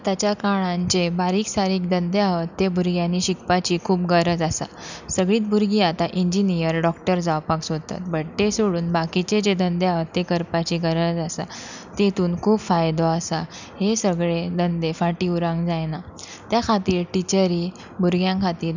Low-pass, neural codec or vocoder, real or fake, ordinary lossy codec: 7.2 kHz; none; real; none